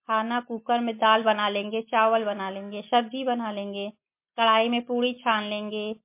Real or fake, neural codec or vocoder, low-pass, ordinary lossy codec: real; none; 3.6 kHz; MP3, 24 kbps